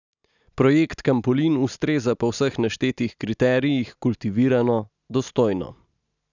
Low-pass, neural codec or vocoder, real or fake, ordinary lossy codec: 7.2 kHz; none; real; none